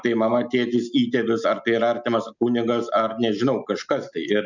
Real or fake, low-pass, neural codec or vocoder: real; 7.2 kHz; none